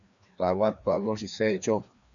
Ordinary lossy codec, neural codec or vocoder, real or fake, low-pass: MP3, 96 kbps; codec, 16 kHz, 2 kbps, FreqCodec, larger model; fake; 7.2 kHz